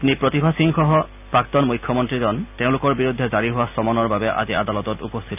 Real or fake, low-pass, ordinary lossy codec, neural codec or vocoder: real; 3.6 kHz; none; none